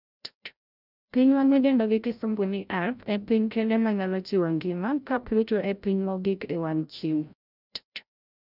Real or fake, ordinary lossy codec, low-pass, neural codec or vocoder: fake; none; 5.4 kHz; codec, 16 kHz, 0.5 kbps, FreqCodec, larger model